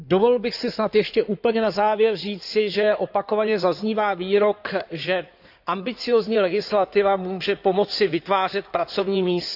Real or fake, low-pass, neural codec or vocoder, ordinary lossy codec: fake; 5.4 kHz; codec, 16 kHz in and 24 kHz out, 2.2 kbps, FireRedTTS-2 codec; AAC, 48 kbps